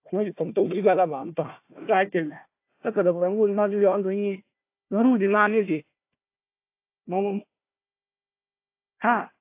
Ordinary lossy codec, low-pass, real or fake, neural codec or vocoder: AAC, 24 kbps; 3.6 kHz; fake; codec, 16 kHz, 1 kbps, FunCodec, trained on Chinese and English, 50 frames a second